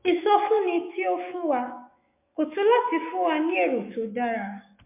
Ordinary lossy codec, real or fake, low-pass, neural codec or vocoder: MP3, 24 kbps; fake; 3.6 kHz; autoencoder, 48 kHz, 128 numbers a frame, DAC-VAE, trained on Japanese speech